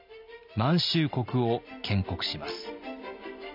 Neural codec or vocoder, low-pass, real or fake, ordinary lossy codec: none; 5.4 kHz; real; none